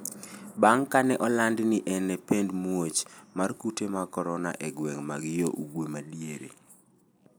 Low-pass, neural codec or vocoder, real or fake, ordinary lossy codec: none; none; real; none